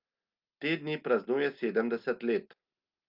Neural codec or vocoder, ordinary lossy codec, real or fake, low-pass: none; Opus, 24 kbps; real; 5.4 kHz